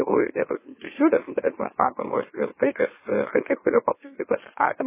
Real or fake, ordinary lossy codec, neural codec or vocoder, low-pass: fake; MP3, 16 kbps; autoencoder, 44.1 kHz, a latent of 192 numbers a frame, MeloTTS; 3.6 kHz